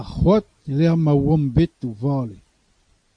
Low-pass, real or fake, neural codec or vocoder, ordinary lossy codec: 9.9 kHz; real; none; MP3, 64 kbps